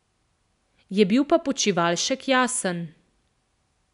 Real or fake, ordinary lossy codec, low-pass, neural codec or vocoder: real; none; 10.8 kHz; none